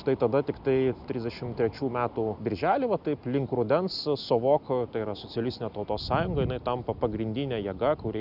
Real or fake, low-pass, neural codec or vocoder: real; 5.4 kHz; none